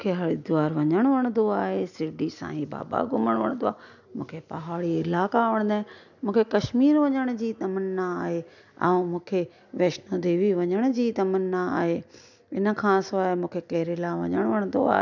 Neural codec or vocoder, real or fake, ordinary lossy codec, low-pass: none; real; none; 7.2 kHz